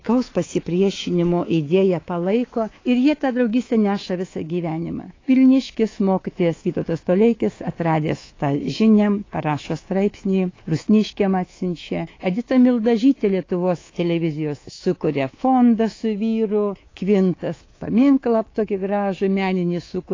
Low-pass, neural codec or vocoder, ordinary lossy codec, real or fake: 7.2 kHz; codec, 24 kHz, 3.1 kbps, DualCodec; AAC, 32 kbps; fake